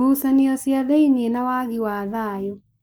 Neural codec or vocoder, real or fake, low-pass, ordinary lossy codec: codec, 44.1 kHz, 7.8 kbps, Pupu-Codec; fake; none; none